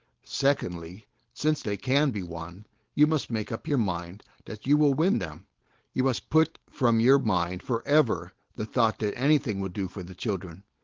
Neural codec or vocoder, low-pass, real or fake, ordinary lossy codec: codec, 16 kHz, 4.8 kbps, FACodec; 7.2 kHz; fake; Opus, 16 kbps